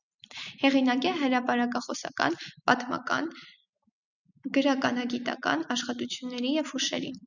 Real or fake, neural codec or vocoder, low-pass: real; none; 7.2 kHz